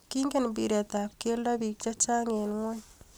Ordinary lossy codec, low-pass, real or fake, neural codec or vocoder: none; none; real; none